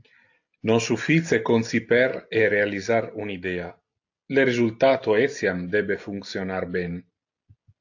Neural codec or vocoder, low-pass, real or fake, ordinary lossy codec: none; 7.2 kHz; real; AAC, 48 kbps